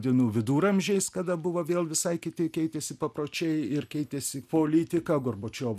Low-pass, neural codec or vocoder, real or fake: 14.4 kHz; none; real